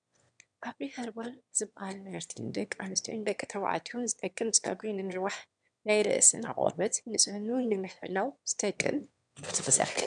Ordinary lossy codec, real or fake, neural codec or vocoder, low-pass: MP3, 96 kbps; fake; autoencoder, 22.05 kHz, a latent of 192 numbers a frame, VITS, trained on one speaker; 9.9 kHz